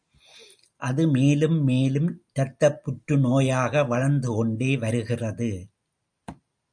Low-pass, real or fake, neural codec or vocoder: 9.9 kHz; real; none